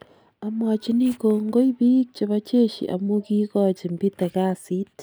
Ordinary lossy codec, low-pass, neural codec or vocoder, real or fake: none; none; none; real